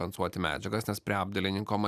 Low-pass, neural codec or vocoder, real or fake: 14.4 kHz; none; real